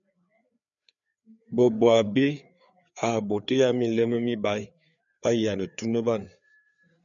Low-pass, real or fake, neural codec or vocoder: 7.2 kHz; fake; codec, 16 kHz, 4 kbps, FreqCodec, larger model